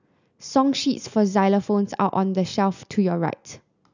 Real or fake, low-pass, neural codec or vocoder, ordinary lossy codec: real; 7.2 kHz; none; none